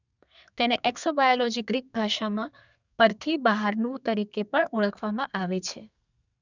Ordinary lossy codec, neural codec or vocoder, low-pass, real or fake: none; codec, 32 kHz, 1.9 kbps, SNAC; 7.2 kHz; fake